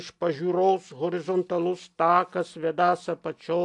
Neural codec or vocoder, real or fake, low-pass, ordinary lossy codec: none; real; 10.8 kHz; AAC, 64 kbps